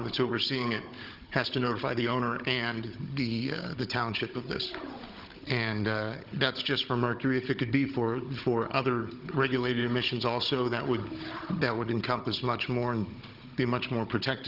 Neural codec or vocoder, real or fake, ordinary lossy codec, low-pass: codec, 16 kHz, 16 kbps, FunCodec, trained on Chinese and English, 50 frames a second; fake; Opus, 24 kbps; 5.4 kHz